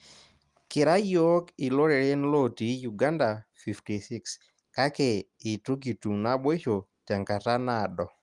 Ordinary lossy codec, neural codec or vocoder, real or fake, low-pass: Opus, 24 kbps; none; real; 10.8 kHz